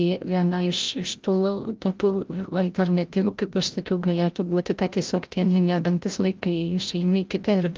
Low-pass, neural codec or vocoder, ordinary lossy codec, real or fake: 7.2 kHz; codec, 16 kHz, 0.5 kbps, FreqCodec, larger model; Opus, 24 kbps; fake